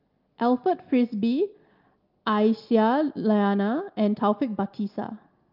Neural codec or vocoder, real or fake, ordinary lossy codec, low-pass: none; real; Opus, 32 kbps; 5.4 kHz